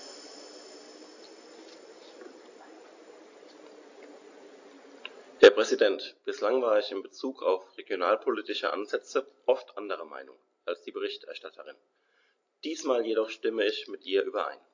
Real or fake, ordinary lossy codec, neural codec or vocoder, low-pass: real; AAC, 48 kbps; none; 7.2 kHz